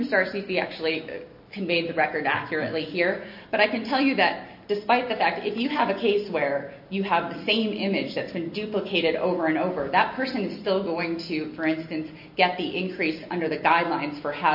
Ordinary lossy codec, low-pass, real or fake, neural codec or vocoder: MP3, 32 kbps; 5.4 kHz; real; none